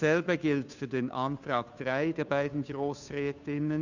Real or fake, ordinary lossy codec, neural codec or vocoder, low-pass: fake; none; codec, 16 kHz, 2 kbps, FunCodec, trained on Chinese and English, 25 frames a second; 7.2 kHz